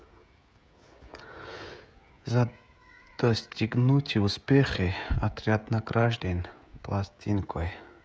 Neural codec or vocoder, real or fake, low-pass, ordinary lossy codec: codec, 16 kHz, 16 kbps, FreqCodec, smaller model; fake; none; none